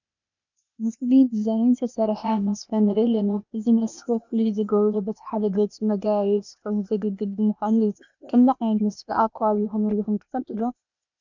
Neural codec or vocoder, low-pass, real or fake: codec, 16 kHz, 0.8 kbps, ZipCodec; 7.2 kHz; fake